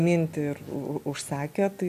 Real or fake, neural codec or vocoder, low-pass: real; none; 14.4 kHz